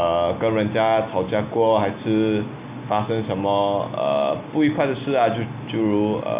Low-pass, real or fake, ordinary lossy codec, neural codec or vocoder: 3.6 kHz; real; Opus, 64 kbps; none